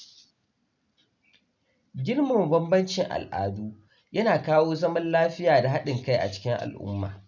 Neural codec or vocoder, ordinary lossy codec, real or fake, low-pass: none; none; real; 7.2 kHz